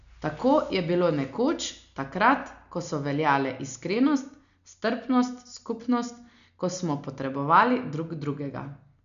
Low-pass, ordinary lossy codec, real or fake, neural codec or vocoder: 7.2 kHz; none; real; none